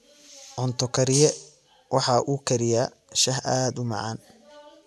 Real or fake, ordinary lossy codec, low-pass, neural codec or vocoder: real; none; none; none